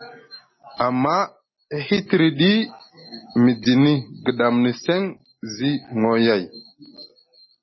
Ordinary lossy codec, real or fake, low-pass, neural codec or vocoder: MP3, 24 kbps; real; 7.2 kHz; none